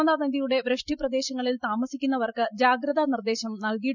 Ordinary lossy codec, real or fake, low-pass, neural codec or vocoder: none; real; 7.2 kHz; none